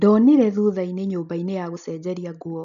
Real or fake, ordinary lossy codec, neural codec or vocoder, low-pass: real; none; none; 7.2 kHz